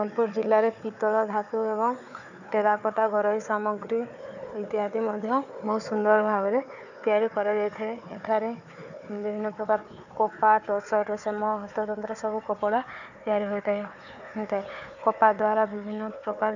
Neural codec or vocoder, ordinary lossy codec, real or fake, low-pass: codec, 16 kHz, 4 kbps, FunCodec, trained on Chinese and English, 50 frames a second; none; fake; 7.2 kHz